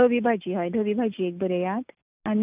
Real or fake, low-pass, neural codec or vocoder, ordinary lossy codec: real; 3.6 kHz; none; none